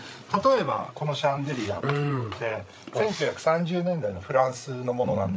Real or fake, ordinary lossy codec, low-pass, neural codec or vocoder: fake; none; none; codec, 16 kHz, 8 kbps, FreqCodec, larger model